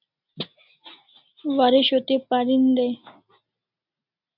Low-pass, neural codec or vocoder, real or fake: 5.4 kHz; none; real